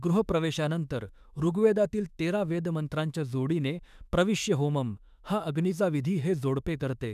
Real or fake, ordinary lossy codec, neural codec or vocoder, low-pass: fake; none; autoencoder, 48 kHz, 32 numbers a frame, DAC-VAE, trained on Japanese speech; 14.4 kHz